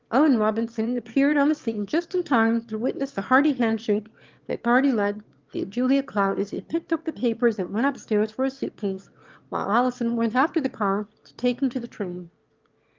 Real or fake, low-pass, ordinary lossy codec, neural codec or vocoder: fake; 7.2 kHz; Opus, 32 kbps; autoencoder, 22.05 kHz, a latent of 192 numbers a frame, VITS, trained on one speaker